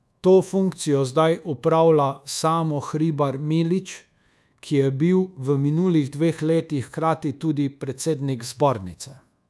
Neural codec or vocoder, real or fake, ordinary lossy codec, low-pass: codec, 24 kHz, 1.2 kbps, DualCodec; fake; none; none